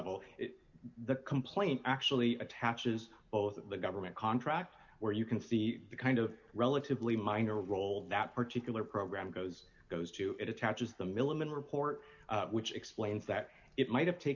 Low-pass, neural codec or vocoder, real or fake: 7.2 kHz; none; real